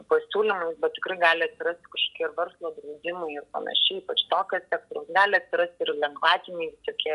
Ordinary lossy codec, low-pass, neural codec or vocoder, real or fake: AAC, 96 kbps; 10.8 kHz; none; real